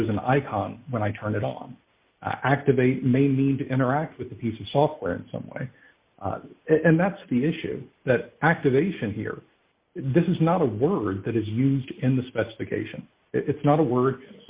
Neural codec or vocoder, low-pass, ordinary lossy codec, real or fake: none; 3.6 kHz; Opus, 64 kbps; real